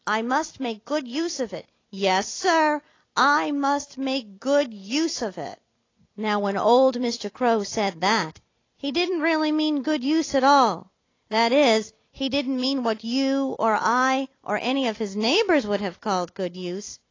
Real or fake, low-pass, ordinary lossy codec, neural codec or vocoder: fake; 7.2 kHz; AAC, 32 kbps; vocoder, 44.1 kHz, 128 mel bands every 256 samples, BigVGAN v2